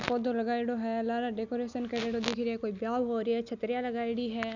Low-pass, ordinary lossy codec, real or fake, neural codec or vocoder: 7.2 kHz; none; real; none